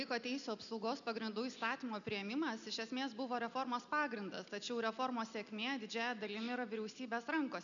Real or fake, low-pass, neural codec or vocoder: real; 7.2 kHz; none